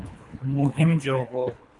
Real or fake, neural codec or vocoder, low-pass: fake; codec, 24 kHz, 3 kbps, HILCodec; 10.8 kHz